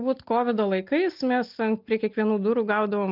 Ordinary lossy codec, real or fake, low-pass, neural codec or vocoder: Opus, 32 kbps; real; 5.4 kHz; none